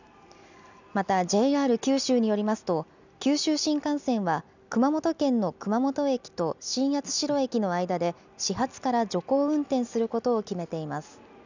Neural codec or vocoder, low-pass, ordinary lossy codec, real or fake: none; 7.2 kHz; none; real